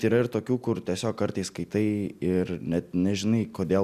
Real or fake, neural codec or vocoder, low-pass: real; none; 14.4 kHz